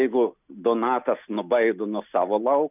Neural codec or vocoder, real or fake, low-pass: none; real; 3.6 kHz